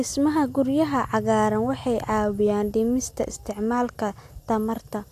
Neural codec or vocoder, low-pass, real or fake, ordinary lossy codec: none; 14.4 kHz; real; AAC, 64 kbps